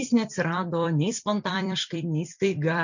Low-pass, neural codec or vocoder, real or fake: 7.2 kHz; vocoder, 44.1 kHz, 128 mel bands every 256 samples, BigVGAN v2; fake